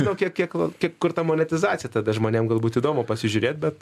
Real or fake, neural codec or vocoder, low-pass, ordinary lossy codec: real; none; 14.4 kHz; MP3, 96 kbps